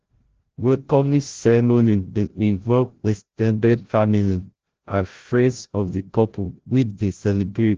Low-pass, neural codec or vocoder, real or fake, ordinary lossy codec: 7.2 kHz; codec, 16 kHz, 0.5 kbps, FreqCodec, larger model; fake; Opus, 16 kbps